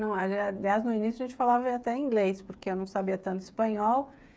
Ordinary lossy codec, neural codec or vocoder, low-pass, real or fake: none; codec, 16 kHz, 8 kbps, FreqCodec, smaller model; none; fake